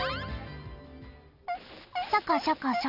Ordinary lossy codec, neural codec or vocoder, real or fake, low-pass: none; none; real; 5.4 kHz